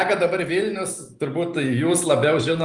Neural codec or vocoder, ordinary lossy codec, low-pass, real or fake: none; Opus, 32 kbps; 10.8 kHz; real